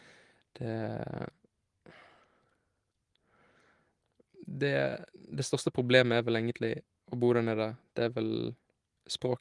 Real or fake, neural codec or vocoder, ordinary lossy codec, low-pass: real; none; Opus, 24 kbps; 10.8 kHz